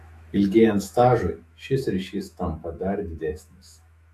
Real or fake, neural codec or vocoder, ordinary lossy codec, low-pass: fake; autoencoder, 48 kHz, 128 numbers a frame, DAC-VAE, trained on Japanese speech; AAC, 64 kbps; 14.4 kHz